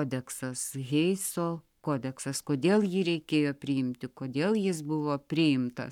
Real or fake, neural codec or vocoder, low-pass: fake; codec, 44.1 kHz, 7.8 kbps, Pupu-Codec; 19.8 kHz